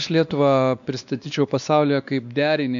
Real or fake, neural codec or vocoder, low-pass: fake; codec, 16 kHz, 2 kbps, X-Codec, WavLM features, trained on Multilingual LibriSpeech; 7.2 kHz